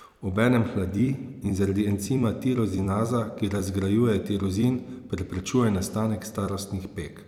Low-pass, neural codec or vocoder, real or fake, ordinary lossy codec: 19.8 kHz; vocoder, 44.1 kHz, 128 mel bands every 256 samples, BigVGAN v2; fake; none